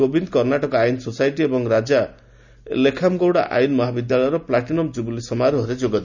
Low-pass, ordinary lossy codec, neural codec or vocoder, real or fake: 7.2 kHz; none; none; real